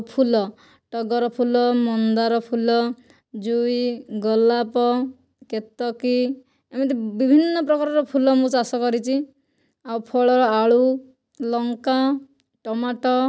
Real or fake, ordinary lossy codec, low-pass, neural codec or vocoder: real; none; none; none